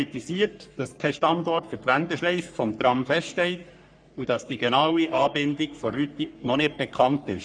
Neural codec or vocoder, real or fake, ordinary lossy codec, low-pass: codec, 44.1 kHz, 3.4 kbps, Pupu-Codec; fake; none; 9.9 kHz